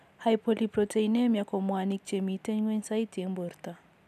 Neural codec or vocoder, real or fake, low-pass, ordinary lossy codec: none; real; 14.4 kHz; none